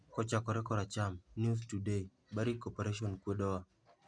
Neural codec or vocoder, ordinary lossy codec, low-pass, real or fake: none; MP3, 96 kbps; 9.9 kHz; real